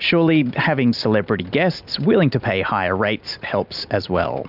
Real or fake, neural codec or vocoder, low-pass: real; none; 5.4 kHz